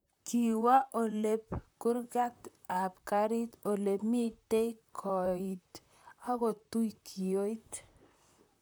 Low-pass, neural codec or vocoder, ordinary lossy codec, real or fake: none; vocoder, 44.1 kHz, 128 mel bands, Pupu-Vocoder; none; fake